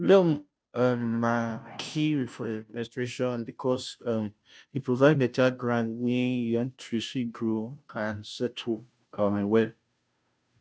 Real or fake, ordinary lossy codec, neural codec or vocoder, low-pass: fake; none; codec, 16 kHz, 0.5 kbps, FunCodec, trained on Chinese and English, 25 frames a second; none